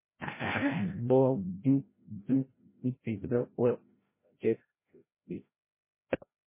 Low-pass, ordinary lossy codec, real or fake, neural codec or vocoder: 3.6 kHz; MP3, 16 kbps; fake; codec, 16 kHz, 0.5 kbps, FreqCodec, larger model